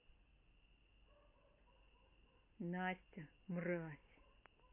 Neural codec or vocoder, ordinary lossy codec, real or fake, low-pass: none; MP3, 24 kbps; real; 3.6 kHz